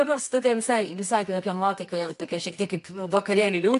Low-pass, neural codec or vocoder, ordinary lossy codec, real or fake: 10.8 kHz; codec, 24 kHz, 0.9 kbps, WavTokenizer, medium music audio release; AAC, 64 kbps; fake